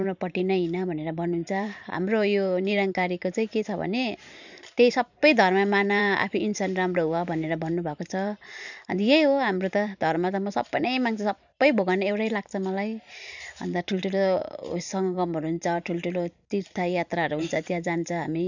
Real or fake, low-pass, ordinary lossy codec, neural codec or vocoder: fake; 7.2 kHz; none; vocoder, 44.1 kHz, 128 mel bands every 512 samples, BigVGAN v2